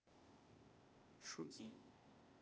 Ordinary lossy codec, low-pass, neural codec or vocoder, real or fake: none; none; codec, 16 kHz, 0.8 kbps, ZipCodec; fake